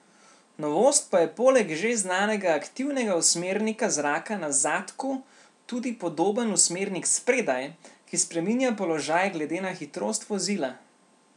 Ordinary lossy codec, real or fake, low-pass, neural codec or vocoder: none; real; 10.8 kHz; none